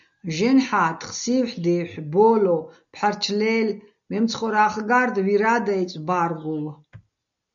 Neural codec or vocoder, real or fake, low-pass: none; real; 7.2 kHz